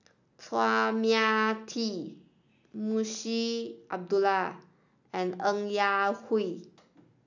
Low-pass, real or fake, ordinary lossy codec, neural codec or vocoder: 7.2 kHz; real; none; none